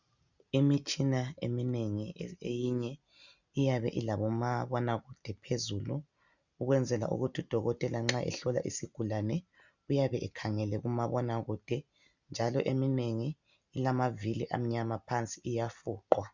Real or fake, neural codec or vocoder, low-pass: real; none; 7.2 kHz